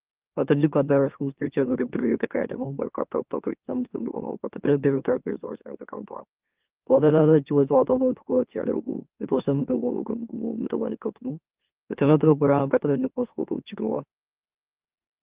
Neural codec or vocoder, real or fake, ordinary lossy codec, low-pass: autoencoder, 44.1 kHz, a latent of 192 numbers a frame, MeloTTS; fake; Opus, 32 kbps; 3.6 kHz